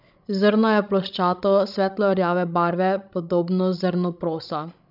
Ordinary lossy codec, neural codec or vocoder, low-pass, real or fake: none; codec, 16 kHz, 16 kbps, FreqCodec, larger model; 5.4 kHz; fake